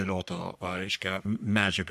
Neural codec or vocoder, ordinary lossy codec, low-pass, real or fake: codec, 44.1 kHz, 3.4 kbps, Pupu-Codec; AAC, 96 kbps; 14.4 kHz; fake